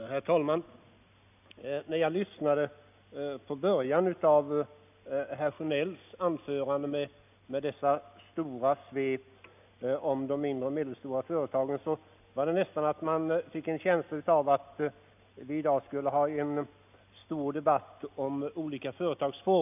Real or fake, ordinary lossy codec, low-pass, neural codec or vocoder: real; none; 3.6 kHz; none